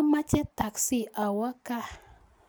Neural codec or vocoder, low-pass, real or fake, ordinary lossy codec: none; none; real; none